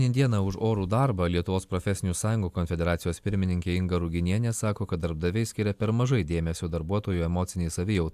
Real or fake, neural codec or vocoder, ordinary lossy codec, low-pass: real; none; AAC, 96 kbps; 14.4 kHz